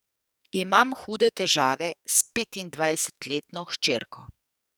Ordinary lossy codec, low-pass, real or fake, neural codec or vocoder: none; none; fake; codec, 44.1 kHz, 2.6 kbps, SNAC